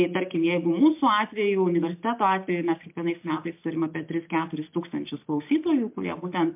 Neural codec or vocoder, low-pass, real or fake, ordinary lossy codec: none; 3.6 kHz; real; MP3, 32 kbps